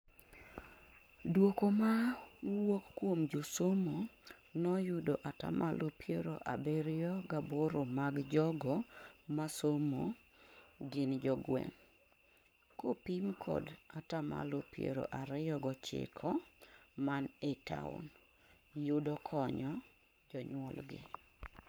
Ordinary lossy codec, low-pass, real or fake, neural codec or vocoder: none; none; fake; codec, 44.1 kHz, 7.8 kbps, Pupu-Codec